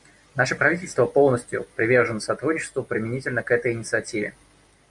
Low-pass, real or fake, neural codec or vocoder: 10.8 kHz; real; none